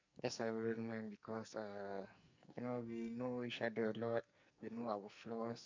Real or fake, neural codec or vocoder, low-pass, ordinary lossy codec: fake; codec, 44.1 kHz, 2.6 kbps, SNAC; 7.2 kHz; MP3, 64 kbps